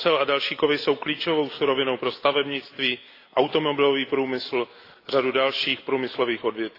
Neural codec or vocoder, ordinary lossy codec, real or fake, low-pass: none; AAC, 32 kbps; real; 5.4 kHz